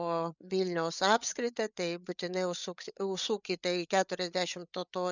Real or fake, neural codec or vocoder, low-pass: fake; codec, 16 kHz, 8 kbps, FreqCodec, larger model; 7.2 kHz